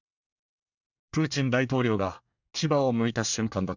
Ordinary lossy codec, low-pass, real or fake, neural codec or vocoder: none; 7.2 kHz; fake; codec, 24 kHz, 1 kbps, SNAC